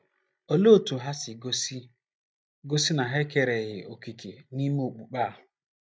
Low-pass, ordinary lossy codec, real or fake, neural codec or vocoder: none; none; real; none